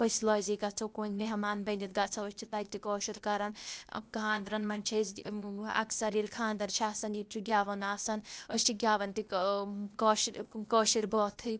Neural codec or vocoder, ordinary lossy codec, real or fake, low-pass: codec, 16 kHz, 0.8 kbps, ZipCodec; none; fake; none